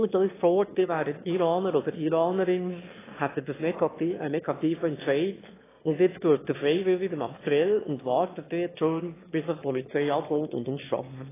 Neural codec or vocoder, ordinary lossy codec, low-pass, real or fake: autoencoder, 22.05 kHz, a latent of 192 numbers a frame, VITS, trained on one speaker; AAC, 16 kbps; 3.6 kHz; fake